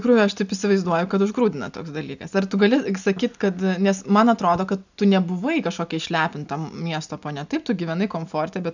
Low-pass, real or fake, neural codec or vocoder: 7.2 kHz; real; none